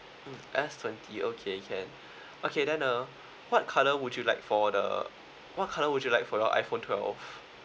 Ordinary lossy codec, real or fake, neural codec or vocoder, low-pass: none; real; none; none